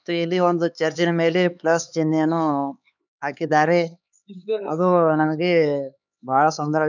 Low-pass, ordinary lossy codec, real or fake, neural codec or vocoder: 7.2 kHz; none; fake; codec, 16 kHz, 4 kbps, X-Codec, HuBERT features, trained on LibriSpeech